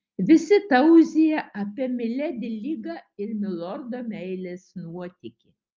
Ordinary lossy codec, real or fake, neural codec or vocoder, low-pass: Opus, 32 kbps; real; none; 7.2 kHz